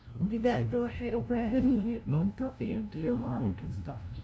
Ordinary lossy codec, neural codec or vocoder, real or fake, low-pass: none; codec, 16 kHz, 0.5 kbps, FunCodec, trained on LibriTTS, 25 frames a second; fake; none